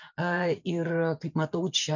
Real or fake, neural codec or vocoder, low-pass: fake; vocoder, 24 kHz, 100 mel bands, Vocos; 7.2 kHz